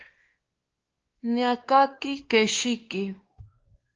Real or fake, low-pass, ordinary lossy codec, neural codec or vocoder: fake; 7.2 kHz; Opus, 16 kbps; codec, 16 kHz, 2 kbps, X-Codec, WavLM features, trained on Multilingual LibriSpeech